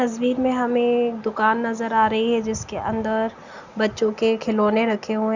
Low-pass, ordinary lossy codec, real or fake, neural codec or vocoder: 7.2 kHz; Opus, 64 kbps; real; none